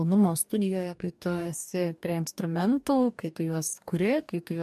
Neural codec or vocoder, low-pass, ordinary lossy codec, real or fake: codec, 44.1 kHz, 2.6 kbps, DAC; 14.4 kHz; MP3, 96 kbps; fake